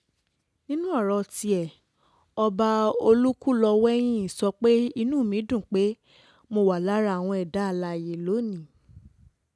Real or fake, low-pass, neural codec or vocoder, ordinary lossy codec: real; none; none; none